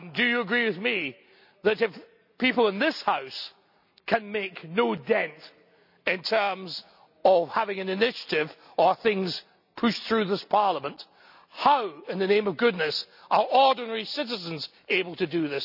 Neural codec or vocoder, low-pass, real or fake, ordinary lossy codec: none; 5.4 kHz; real; none